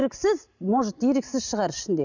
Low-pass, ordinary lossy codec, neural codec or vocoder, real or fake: 7.2 kHz; none; none; real